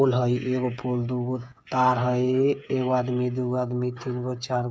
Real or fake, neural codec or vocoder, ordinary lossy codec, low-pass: fake; codec, 16 kHz, 8 kbps, FreqCodec, smaller model; none; none